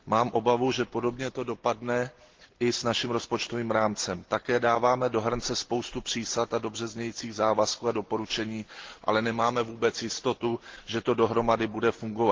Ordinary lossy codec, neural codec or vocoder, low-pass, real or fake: Opus, 16 kbps; none; 7.2 kHz; real